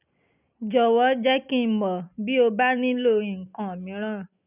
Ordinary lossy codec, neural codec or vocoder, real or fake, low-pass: none; none; real; 3.6 kHz